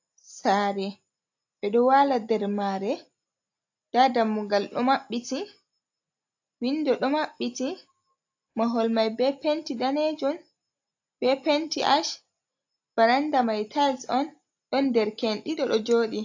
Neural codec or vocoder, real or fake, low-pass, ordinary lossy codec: none; real; 7.2 kHz; AAC, 48 kbps